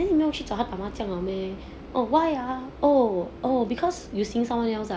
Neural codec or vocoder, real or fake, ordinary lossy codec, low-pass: none; real; none; none